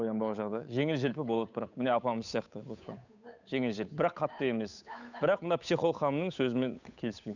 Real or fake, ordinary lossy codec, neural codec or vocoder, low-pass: fake; none; codec, 16 kHz, 8 kbps, FunCodec, trained on Chinese and English, 25 frames a second; 7.2 kHz